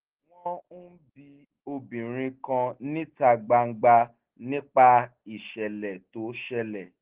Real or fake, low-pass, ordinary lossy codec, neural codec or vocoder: real; 3.6 kHz; Opus, 16 kbps; none